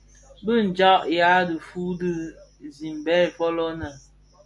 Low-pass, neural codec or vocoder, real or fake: 10.8 kHz; none; real